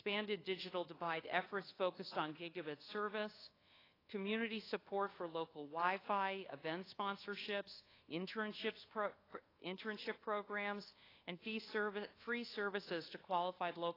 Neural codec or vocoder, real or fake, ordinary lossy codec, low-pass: codec, 24 kHz, 1.2 kbps, DualCodec; fake; AAC, 24 kbps; 5.4 kHz